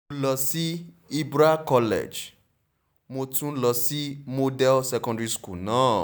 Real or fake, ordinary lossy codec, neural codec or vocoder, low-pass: real; none; none; none